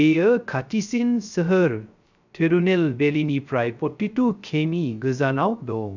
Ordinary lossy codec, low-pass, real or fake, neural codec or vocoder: none; 7.2 kHz; fake; codec, 16 kHz, 0.3 kbps, FocalCodec